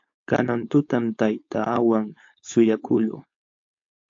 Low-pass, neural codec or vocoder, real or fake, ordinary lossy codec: 7.2 kHz; codec, 16 kHz, 4.8 kbps, FACodec; fake; AAC, 48 kbps